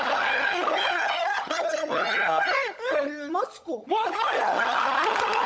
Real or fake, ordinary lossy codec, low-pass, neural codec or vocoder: fake; none; none; codec, 16 kHz, 16 kbps, FunCodec, trained on LibriTTS, 50 frames a second